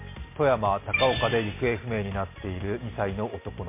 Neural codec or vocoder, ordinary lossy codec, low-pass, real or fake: none; MP3, 24 kbps; 3.6 kHz; real